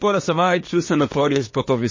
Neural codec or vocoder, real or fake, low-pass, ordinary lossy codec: codec, 24 kHz, 1 kbps, SNAC; fake; 7.2 kHz; MP3, 32 kbps